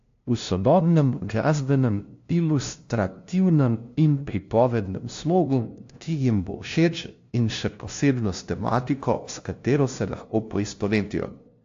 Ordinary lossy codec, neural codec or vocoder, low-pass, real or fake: AAC, 48 kbps; codec, 16 kHz, 0.5 kbps, FunCodec, trained on LibriTTS, 25 frames a second; 7.2 kHz; fake